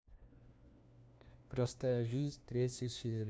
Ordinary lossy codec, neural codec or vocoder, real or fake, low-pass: none; codec, 16 kHz, 1 kbps, FunCodec, trained on LibriTTS, 50 frames a second; fake; none